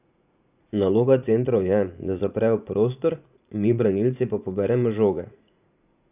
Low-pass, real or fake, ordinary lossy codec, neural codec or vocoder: 3.6 kHz; fake; none; vocoder, 44.1 kHz, 80 mel bands, Vocos